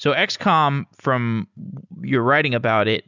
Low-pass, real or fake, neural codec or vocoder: 7.2 kHz; real; none